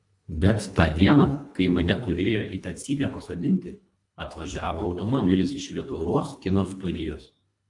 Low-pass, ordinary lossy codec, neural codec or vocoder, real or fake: 10.8 kHz; AAC, 64 kbps; codec, 24 kHz, 1.5 kbps, HILCodec; fake